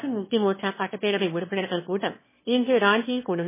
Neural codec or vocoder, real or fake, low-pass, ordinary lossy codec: autoencoder, 22.05 kHz, a latent of 192 numbers a frame, VITS, trained on one speaker; fake; 3.6 kHz; MP3, 16 kbps